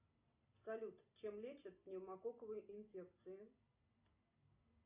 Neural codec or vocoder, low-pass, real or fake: none; 3.6 kHz; real